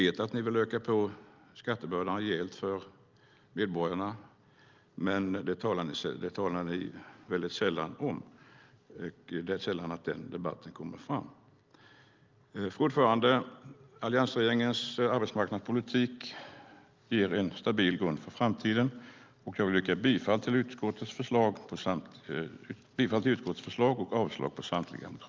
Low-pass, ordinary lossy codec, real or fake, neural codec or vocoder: 7.2 kHz; Opus, 24 kbps; real; none